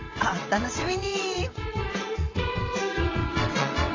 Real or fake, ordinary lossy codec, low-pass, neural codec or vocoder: fake; AAC, 32 kbps; 7.2 kHz; vocoder, 22.05 kHz, 80 mel bands, WaveNeXt